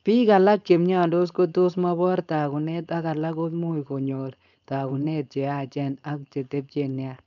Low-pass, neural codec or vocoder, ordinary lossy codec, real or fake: 7.2 kHz; codec, 16 kHz, 4.8 kbps, FACodec; none; fake